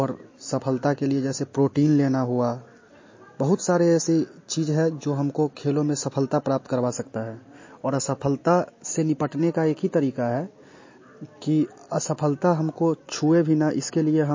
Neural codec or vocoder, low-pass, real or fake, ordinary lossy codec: none; 7.2 kHz; real; MP3, 32 kbps